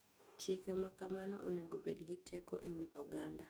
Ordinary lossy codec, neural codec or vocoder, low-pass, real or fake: none; codec, 44.1 kHz, 2.6 kbps, DAC; none; fake